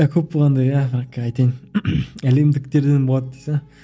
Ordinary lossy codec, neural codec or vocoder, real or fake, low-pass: none; none; real; none